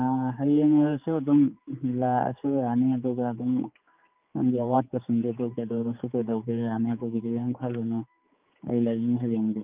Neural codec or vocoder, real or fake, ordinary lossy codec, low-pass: codec, 16 kHz, 4 kbps, X-Codec, HuBERT features, trained on general audio; fake; Opus, 24 kbps; 3.6 kHz